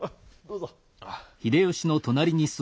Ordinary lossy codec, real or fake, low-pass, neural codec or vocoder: none; real; none; none